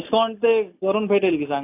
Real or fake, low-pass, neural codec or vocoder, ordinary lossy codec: real; 3.6 kHz; none; AAC, 24 kbps